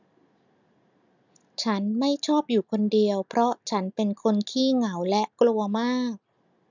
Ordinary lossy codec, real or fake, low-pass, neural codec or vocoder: none; real; 7.2 kHz; none